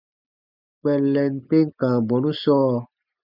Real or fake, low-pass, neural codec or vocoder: real; 5.4 kHz; none